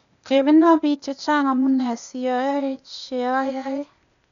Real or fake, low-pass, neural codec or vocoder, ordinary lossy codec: fake; 7.2 kHz; codec, 16 kHz, 0.8 kbps, ZipCodec; none